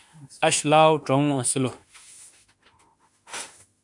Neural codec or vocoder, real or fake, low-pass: autoencoder, 48 kHz, 32 numbers a frame, DAC-VAE, trained on Japanese speech; fake; 10.8 kHz